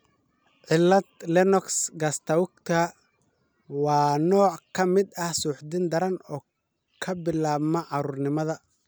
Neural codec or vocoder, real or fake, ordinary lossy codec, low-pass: none; real; none; none